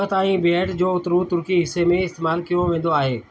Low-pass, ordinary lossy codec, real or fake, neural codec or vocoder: none; none; real; none